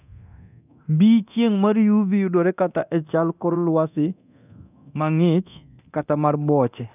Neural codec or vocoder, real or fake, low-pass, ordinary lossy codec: codec, 24 kHz, 0.9 kbps, DualCodec; fake; 3.6 kHz; none